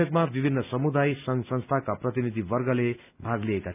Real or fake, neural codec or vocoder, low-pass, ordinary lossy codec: real; none; 3.6 kHz; none